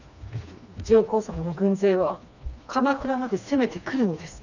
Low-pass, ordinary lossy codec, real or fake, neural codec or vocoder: 7.2 kHz; none; fake; codec, 16 kHz, 2 kbps, FreqCodec, smaller model